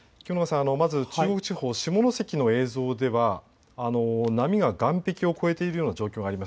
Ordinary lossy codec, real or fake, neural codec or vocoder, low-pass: none; real; none; none